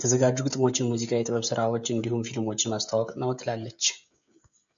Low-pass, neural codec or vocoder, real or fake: 7.2 kHz; codec, 16 kHz, 16 kbps, FreqCodec, smaller model; fake